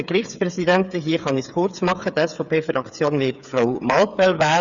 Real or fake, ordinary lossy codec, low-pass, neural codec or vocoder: fake; none; 7.2 kHz; codec, 16 kHz, 8 kbps, FreqCodec, smaller model